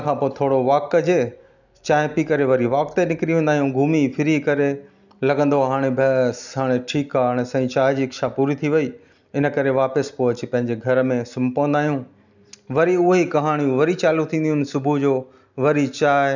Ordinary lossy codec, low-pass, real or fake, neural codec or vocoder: none; 7.2 kHz; real; none